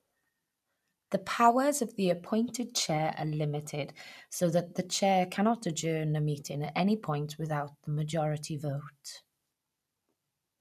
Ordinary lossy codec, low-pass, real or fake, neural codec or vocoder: none; 14.4 kHz; real; none